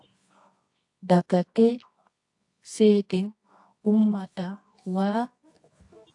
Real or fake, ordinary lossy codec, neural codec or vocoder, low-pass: fake; AAC, 64 kbps; codec, 24 kHz, 0.9 kbps, WavTokenizer, medium music audio release; 10.8 kHz